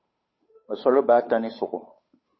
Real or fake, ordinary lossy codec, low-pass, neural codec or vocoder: fake; MP3, 24 kbps; 7.2 kHz; codec, 16 kHz, 8 kbps, FunCodec, trained on Chinese and English, 25 frames a second